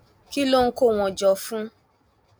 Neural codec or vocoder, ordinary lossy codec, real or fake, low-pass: none; none; real; none